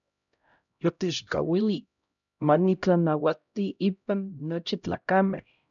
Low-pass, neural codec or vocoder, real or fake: 7.2 kHz; codec, 16 kHz, 0.5 kbps, X-Codec, HuBERT features, trained on LibriSpeech; fake